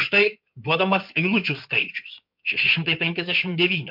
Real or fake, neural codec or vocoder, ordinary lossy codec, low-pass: fake; codec, 16 kHz in and 24 kHz out, 2.2 kbps, FireRedTTS-2 codec; MP3, 48 kbps; 5.4 kHz